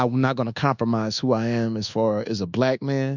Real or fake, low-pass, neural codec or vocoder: fake; 7.2 kHz; codec, 24 kHz, 1.2 kbps, DualCodec